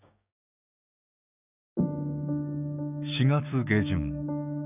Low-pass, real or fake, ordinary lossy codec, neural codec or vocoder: 3.6 kHz; fake; none; codec, 16 kHz, 6 kbps, DAC